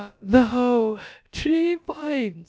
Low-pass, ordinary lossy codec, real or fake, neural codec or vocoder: none; none; fake; codec, 16 kHz, about 1 kbps, DyCAST, with the encoder's durations